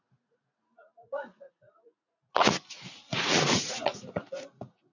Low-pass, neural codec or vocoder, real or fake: 7.2 kHz; vocoder, 44.1 kHz, 80 mel bands, Vocos; fake